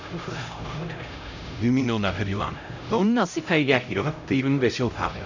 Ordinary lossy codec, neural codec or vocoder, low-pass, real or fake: none; codec, 16 kHz, 0.5 kbps, X-Codec, HuBERT features, trained on LibriSpeech; 7.2 kHz; fake